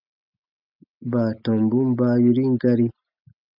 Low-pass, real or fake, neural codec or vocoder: 5.4 kHz; real; none